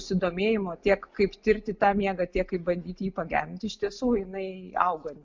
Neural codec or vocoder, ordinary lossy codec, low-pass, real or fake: none; MP3, 64 kbps; 7.2 kHz; real